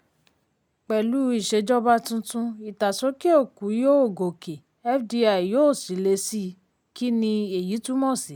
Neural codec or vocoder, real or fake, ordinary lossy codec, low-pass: none; real; none; none